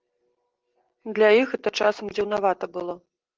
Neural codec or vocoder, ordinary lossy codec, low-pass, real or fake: none; Opus, 16 kbps; 7.2 kHz; real